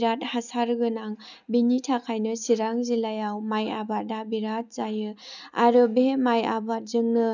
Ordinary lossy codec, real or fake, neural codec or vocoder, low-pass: none; real; none; 7.2 kHz